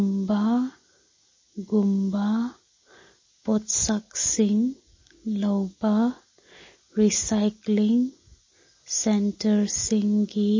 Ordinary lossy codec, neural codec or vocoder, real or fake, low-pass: MP3, 32 kbps; none; real; 7.2 kHz